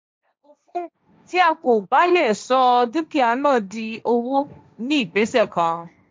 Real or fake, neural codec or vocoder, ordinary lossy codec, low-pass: fake; codec, 16 kHz, 1.1 kbps, Voila-Tokenizer; none; none